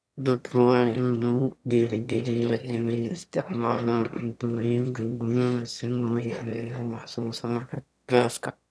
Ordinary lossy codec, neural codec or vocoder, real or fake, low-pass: none; autoencoder, 22.05 kHz, a latent of 192 numbers a frame, VITS, trained on one speaker; fake; none